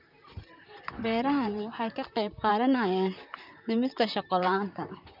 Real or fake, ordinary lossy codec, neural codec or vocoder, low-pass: fake; none; vocoder, 44.1 kHz, 128 mel bands, Pupu-Vocoder; 5.4 kHz